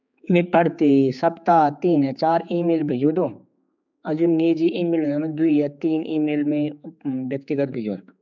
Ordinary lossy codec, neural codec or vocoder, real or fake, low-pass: none; codec, 16 kHz, 4 kbps, X-Codec, HuBERT features, trained on general audio; fake; 7.2 kHz